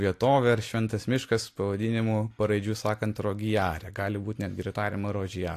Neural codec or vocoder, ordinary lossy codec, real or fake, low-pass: none; AAC, 64 kbps; real; 14.4 kHz